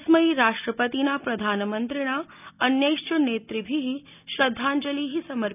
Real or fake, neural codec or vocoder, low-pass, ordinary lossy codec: real; none; 3.6 kHz; none